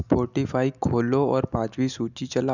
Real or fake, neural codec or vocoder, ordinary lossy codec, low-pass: real; none; none; 7.2 kHz